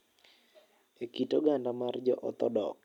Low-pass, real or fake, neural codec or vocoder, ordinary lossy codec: 19.8 kHz; real; none; none